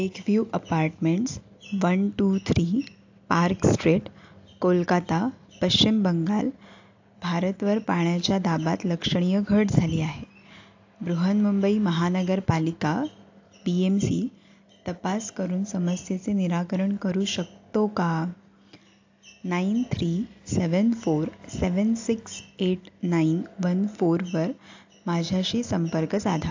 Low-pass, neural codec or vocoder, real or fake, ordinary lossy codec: 7.2 kHz; none; real; AAC, 48 kbps